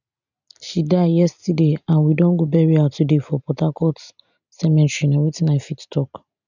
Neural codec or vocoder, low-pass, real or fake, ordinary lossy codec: none; 7.2 kHz; real; none